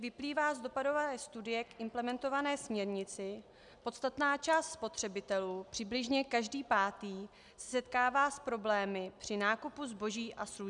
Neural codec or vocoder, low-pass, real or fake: none; 10.8 kHz; real